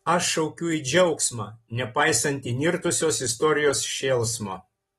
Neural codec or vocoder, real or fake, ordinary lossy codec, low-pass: none; real; AAC, 32 kbps; 19.8 kHz